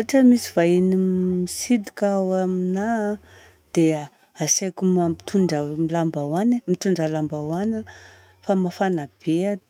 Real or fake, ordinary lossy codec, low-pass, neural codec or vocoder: fake; none; 19.8 kHz; autoencoder, 48 kHz, 128 numbers a frame, DAC-VAE, trained on Japanese speech